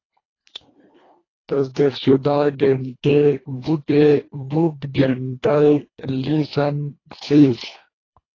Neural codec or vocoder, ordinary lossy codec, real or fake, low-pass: codec, 24 kHz, 1.5 kbps, HILCodec; AAC, 32 kbps; fake; 7.2 kHz